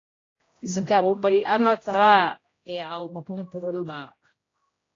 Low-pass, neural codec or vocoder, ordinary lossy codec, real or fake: 7.2 kHz; codec, 16 kHz, 0.5 kbps, X-Codec, HuBERT features, trained on general audio; AAC, 32 kbps; fake